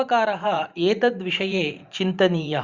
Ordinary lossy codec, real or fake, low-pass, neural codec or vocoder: Opus, 64 kbps; fake; 7.2 kHz; vocoder, 44.1 kHz, 128 mel bands every 512 samples, BigVGAN v2